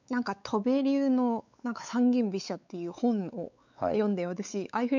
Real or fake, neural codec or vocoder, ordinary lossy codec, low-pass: fake; codec, 16 kHz, 4 kbps, X-Codec, WavLM features, trained on Multilingual LibriSpeech; none; 7.2 kHz